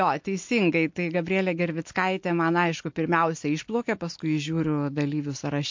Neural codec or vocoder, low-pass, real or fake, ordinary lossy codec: none; 7.2 kHz; real; MP3, 48 kbps